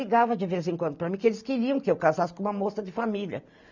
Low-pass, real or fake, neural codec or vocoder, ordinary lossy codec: 7.2 kHz; real; none; none